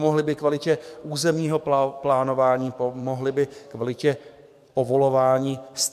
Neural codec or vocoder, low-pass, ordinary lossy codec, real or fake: codec, 44.1 kHz, 7.8 kbps, DAC; 14.4 kHz; MP3, 96 kbps; fake